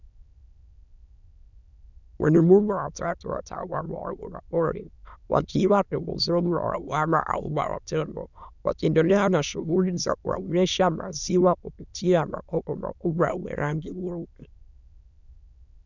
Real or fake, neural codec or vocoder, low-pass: fake; autoencoder, 22.05 kHz, a latent of 192 numbers a frame, VITS, trained on many speakers; 7.2 kHz